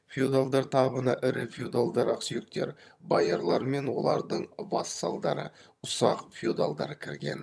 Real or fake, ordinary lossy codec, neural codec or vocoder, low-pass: fake; none; vocoder, 22.05 kHz, 80 mel bands, HiFi-GAN; none